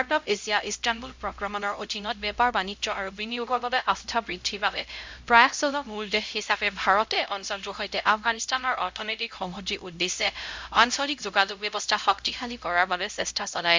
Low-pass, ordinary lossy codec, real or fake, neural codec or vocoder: 7.2 kHz; MP3, 64 kbps; fake; codec, 16 kHz, 0.5 kbps, X-Codec, HuBERT features, trained on LibriSpeech